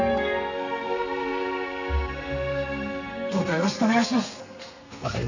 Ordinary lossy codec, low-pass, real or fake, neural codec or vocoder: none; 7.2 kHz; fake; codec, 32 kHz, 1.9 kbps, SNAC